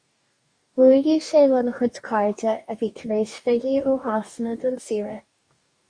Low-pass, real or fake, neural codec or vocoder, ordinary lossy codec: 9.9 kHz; fake; codec, 44.1 kHz, 2.6 kbps, DAC; Opus, 64 kbps